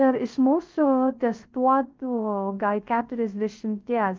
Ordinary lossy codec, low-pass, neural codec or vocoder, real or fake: Opus, 32 kbps; 7.2 kHz; codec, 16 kHz, 0.3 kbps, FocalCodec; fake